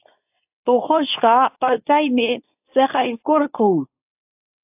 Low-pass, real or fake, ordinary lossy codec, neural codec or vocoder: 3.6 kHz; fake; AAC, 32 kbps; codec, 24 kHz, 0.9 kbps, WavTokenizer, medium speech release version 2